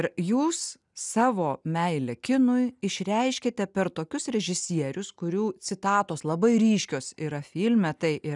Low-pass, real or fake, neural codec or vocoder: 10.8 kHz; real; none